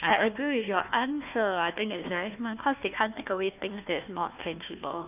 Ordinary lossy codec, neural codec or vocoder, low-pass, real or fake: none; codec, 16 kHz, 1 kbps, FunCodec, trained on Chinese and English, 50 frames a second; 3.6 kHz; fake